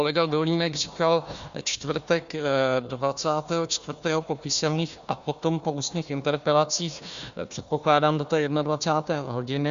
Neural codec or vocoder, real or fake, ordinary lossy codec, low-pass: codec, 16 kHz, 1 kbps, FunCodec, trained on Chinese and English, 50 frames a second; fake; Opus, 64 kbps; 7.2 kHz